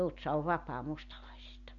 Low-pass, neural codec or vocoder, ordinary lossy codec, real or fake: 7.2 kHz; none; none; real